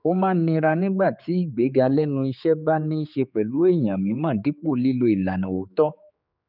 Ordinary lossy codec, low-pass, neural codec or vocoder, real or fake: none; 5.4 kHz; codec, 16 kHz, 4 kbps, X-Codec, HuBERT features, trained on general audio; fake